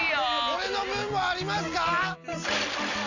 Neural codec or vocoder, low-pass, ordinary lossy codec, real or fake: none; 7.2 kHz; none; real